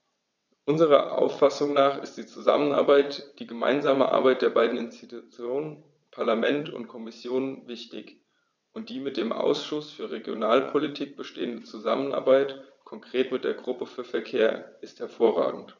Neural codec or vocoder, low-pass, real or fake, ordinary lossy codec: vocoder, 22.05 kHz, 80 mel bands, WaveNeXt; 7.2 kHz; fake; none